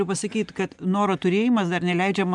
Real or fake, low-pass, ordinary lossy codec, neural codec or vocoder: real; 10.8 kHz; MP3, 96 kbps; none